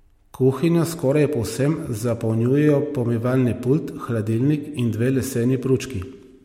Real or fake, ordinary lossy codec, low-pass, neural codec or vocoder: real; MP3, 64 kbps; 19.8 kHz; none